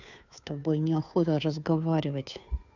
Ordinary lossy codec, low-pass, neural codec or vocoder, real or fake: none; 7.2 kHz; codec, 16 kHz, 2 kbps, FunCodec, trained on Chinese and English, 25 frames a second; fake